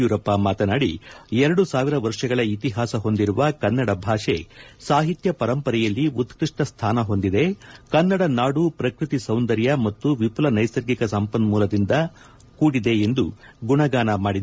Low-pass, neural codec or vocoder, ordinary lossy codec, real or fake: none; none; none; real